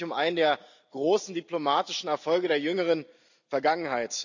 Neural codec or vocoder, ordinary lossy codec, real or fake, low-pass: none; none; real; 7.2 kHz